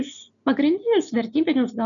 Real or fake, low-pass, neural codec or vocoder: real; 7.2 kHz; none